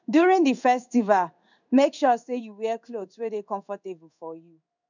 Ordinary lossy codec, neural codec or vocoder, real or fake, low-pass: none; codec, 16 kHz in and 24 kHz out, 1 kbps, XY-Tokenizer; fake; 7.2 kHz